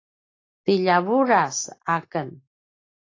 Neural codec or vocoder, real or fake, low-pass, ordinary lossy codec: none; real; 7.2 kHz; AAC, 32 kbps